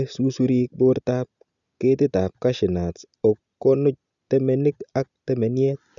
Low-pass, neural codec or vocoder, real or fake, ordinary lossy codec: 7.2 kHz; none; real; none